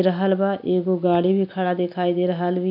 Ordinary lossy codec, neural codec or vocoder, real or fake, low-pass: AAC, 48 kbps; none; real; 5.4 kHz